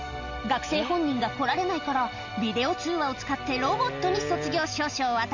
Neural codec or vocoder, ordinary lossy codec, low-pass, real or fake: none; Opus, 64 kbps; 7.2 kHz; real